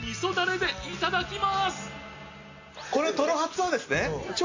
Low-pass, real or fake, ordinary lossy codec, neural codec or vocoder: 7.2 kHz; real; AAC, 48 kbps; none